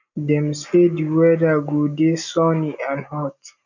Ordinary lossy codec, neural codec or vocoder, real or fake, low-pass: none; none; real; 7.2 kHz